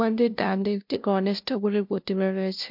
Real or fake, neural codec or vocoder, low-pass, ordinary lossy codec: fake; codec, 16 kHz, 0.5 kbps, FunCodec, trained on LibriTTS, 25 frames a second; 5.4 kHz; MP3, 48 kbps